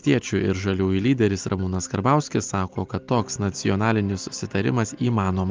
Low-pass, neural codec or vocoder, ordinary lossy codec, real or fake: 7.2 kHz; none; Opus, 24 kbps; real